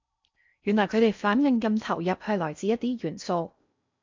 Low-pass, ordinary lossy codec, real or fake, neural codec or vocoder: 7.2 kHz; MP3, 64 kbps; fake; codec, 16 kHz in and 24 kHz out, 0.6 kbps, FocalCodec, streaming, 4096 codes